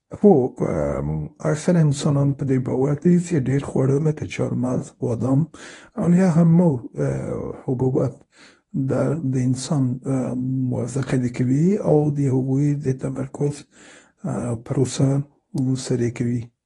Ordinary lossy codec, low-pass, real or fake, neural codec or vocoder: AAC, 32 kbps; 10.8 kHz; fake; codec, 24 kHz, 0.9 kbps, WavTokenizer, medium speech release version 1